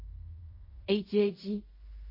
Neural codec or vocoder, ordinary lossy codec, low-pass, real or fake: codec, 16 kHz in and 24 kHz out, 0.4 kbps, LongCat-Audio-Codec, fine tuned four codebook decoder; MP3, 24 kbps; 5.4 kHz; fake